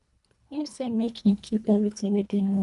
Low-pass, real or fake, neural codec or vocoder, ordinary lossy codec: 10.8 kHz; fake; codec, 24 kHz, 1.5 kbps, HILCodec; none